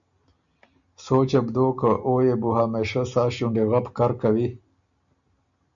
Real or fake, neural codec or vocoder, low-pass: real; none; 7.2 kHz